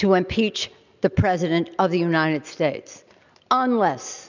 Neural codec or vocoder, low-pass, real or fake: none; 7.2 kHz; real